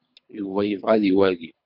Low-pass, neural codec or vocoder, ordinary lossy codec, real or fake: 5.4 kHz; codec, 24 kHz, 3 kbps, HILCodec; MP3, 48 kbps; fake